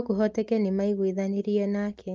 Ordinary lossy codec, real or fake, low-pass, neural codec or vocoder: Opus, 32 kbps; real; 7.2 kHz; none